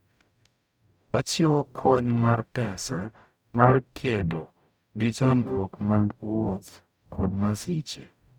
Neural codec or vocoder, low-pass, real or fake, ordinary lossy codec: codec, 44.1 kHz, 0.9 kbps, DAC; none; fake; none